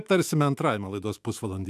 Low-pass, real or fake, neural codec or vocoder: 14.4 kHz; fake; autoencoder, 48 kHz, 128 numbers a frame, DAC-VAE, trained on Japanese speech